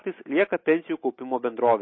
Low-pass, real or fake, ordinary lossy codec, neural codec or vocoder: 7.2 kHz; real; MP3, 24 kbps; none